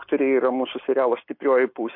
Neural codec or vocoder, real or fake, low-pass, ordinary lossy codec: none; real; 5.4 kHz; MP3, 32 kbps